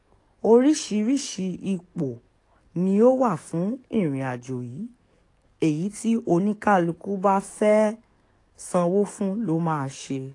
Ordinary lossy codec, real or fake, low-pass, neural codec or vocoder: AAC, 48 kbps; fake; 10.8 kHz; codec, 44.1 kHz, 7.8 kbps, DAC